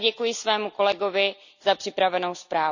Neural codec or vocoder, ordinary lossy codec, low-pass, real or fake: none; none; 7.2 kHz; real